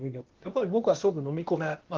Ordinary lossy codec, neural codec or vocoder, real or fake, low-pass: Opus, 16 kbps; codec, 16 kHz, 0.8 kbps, ZipCodec; fake; 7.2 kHz